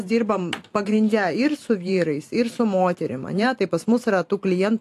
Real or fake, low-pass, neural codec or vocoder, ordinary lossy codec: fake; 14.4 kHz; vocoder, 44.1 kHz, 128 mel bands every 512 samples, BigVGAN v2; AAC, 64 kbps